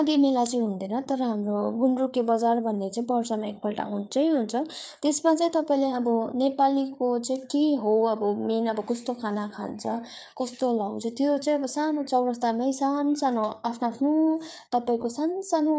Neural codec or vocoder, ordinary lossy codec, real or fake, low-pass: codec, 16 kHz, 4 kbps, FreqCodec, larger model; none; fake; none